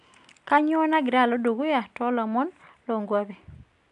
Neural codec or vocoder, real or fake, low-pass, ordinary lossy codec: none; real; 10.8 kHz; none